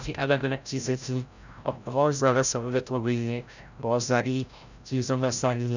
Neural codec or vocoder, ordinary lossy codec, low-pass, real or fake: codec, 16 kHz, 0.5 kbps, FreqCodec, larger model; none; 7.2 kHz; fake